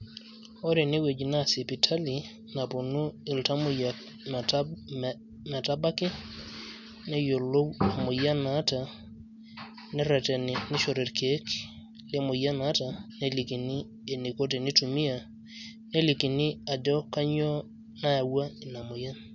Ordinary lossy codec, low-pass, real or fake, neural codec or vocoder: none; 7.2 kHz; real; none